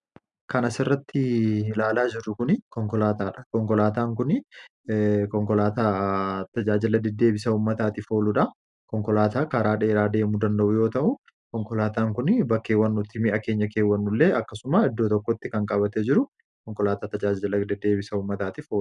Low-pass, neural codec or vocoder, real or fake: 10.8 kHz; none; real